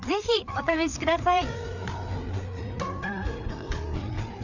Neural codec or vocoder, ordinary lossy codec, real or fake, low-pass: codec, 16 kHz, 4 kbps, FreqCodec, larger model; none; fake; 7.2 kHz